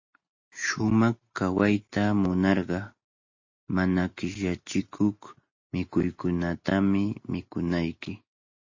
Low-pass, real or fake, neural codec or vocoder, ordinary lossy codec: 7.2 kHz; real; none; MP3, 32 kbps